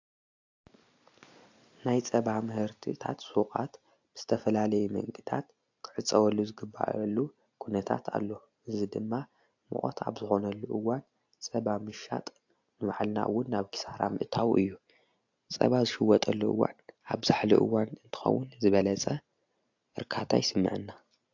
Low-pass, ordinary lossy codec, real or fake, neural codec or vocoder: 7.2 kHz; AAC, 48 kbps; real; none